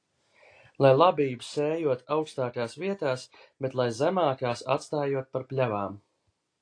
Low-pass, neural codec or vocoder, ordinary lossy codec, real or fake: 9.9 kHz; none; AAC, 48 kbps; real